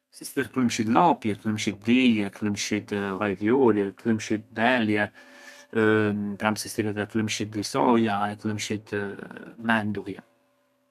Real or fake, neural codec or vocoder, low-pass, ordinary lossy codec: fake; codec, 32 kHz, 1.9 kbps, SNAC; 14.4 kHz; none